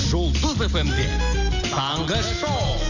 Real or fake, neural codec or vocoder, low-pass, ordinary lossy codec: real; none; 7.2 kHz; none